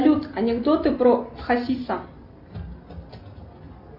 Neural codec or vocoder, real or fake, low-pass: none; real; 5.4 kHz